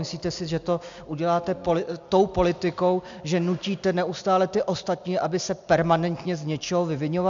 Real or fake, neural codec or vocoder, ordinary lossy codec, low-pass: real; none; MP3, 64 kbps; 7.2 kHz